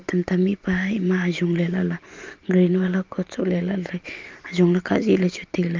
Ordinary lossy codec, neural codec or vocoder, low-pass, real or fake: Opus, 32 kbps; vocoder, 44.1 kHz, 128 mel bands every 512 samples, BigVGAN v2; 7.2 kHz; fake